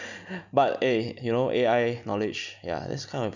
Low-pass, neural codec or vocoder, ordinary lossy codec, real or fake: 7.2 kHz; none; none; real